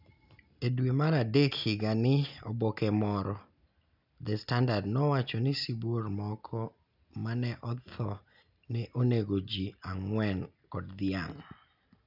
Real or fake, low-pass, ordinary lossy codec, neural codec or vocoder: real; 5.4 kHz; none; none